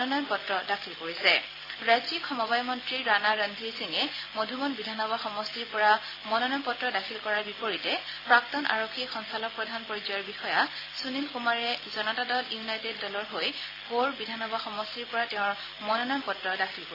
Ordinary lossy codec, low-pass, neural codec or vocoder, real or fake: AAC, 24 kbps; 5.4 kHz; none; real